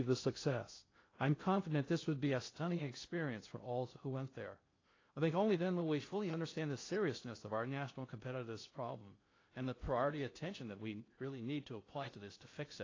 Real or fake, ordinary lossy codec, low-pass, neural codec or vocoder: fake; AAC, 32 kbps; 7.2 kHz; codec, 16 kHz in and 24 kHz out, 0.6 kbps, FocalCodec, streaming, 2048 codes